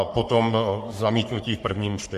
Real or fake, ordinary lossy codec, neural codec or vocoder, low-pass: fake; MP3, 64 kbps; codec, 44.1 kHz, 3.4 kbps, Pupu-Codec; 14.4 kHz